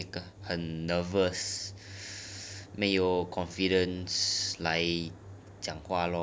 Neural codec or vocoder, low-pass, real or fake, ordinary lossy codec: none; none; real; none